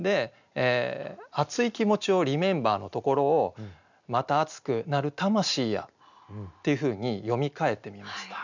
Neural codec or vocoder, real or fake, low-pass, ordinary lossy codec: none; real; 7.2 kHz; none